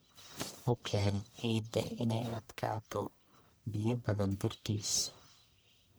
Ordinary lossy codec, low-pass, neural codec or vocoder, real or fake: none; none; codec, 44.1 kHz, 1.7 kbps, Pupu-Codec; fake